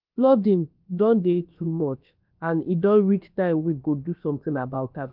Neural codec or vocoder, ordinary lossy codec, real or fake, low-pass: codec, 16 kHz, about 1 kbps, DyCAST, with the encoder's durations; Opus, 24 kbps; fake; 5.4 kHz